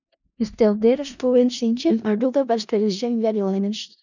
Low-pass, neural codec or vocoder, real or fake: 7.2 kHz; codec, 16 kHz in and 24 kHz out, 0.4 kbps, LongCat-Audio-Codec, four codebook decoder; fake